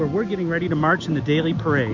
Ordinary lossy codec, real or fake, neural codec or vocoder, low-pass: MP3, 48 kbps; real; none; 7.2 kHz